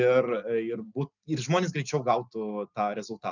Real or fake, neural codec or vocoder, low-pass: real; none; 7.2 kHz